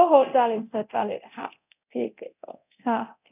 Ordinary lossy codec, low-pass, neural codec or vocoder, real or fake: none; 3.6 kHz; codec, 24 kHz, 0.9 kbps, DualCodec; fake